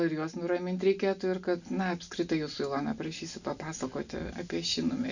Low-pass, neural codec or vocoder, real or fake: 7.2 kHz; none; real